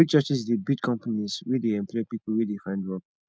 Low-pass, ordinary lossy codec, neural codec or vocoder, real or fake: none; none; none; real